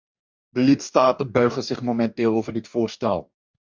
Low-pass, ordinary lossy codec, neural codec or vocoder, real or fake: 7.2 kHz; MP3, 64 kbps; codec, 44.1 kHz, 2.6 kbps, DAC; fake